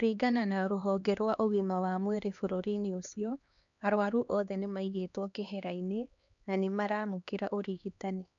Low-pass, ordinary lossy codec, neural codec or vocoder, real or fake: 7.2 kHz; none; codec, 16 kHz, 2 kbps, X-Codec, HuBERT features, trained on LibriSpeech; fake